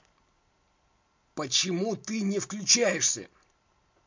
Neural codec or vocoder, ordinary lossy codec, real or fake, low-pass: none; MP3, 48 kbps; real; 7.2 kHz